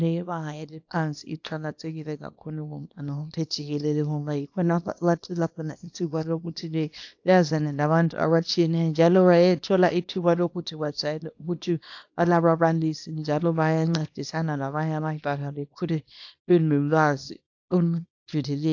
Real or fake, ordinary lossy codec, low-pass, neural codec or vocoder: fake; AAC, 48 kbps; 7.2 kHz; codec, 24 kHz, 0.9 kbps, WavTokenizer, small release